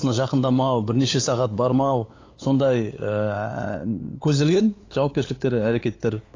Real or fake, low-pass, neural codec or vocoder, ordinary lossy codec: fake; 7.2 kHz; codec, 16 kHz, 8 kbps, FunCodec, trained on LibriTTS, 25 frames a second; AAC, 32 kbps